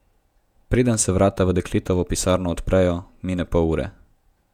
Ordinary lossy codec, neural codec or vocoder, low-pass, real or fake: none; vocoder, 48 kHz, 128 mel bands, Vocos; 19.8 kHz; fake